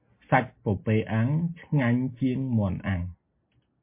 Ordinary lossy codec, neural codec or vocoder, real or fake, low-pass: MP3, 24 kbps; none; real; 3.6 kHz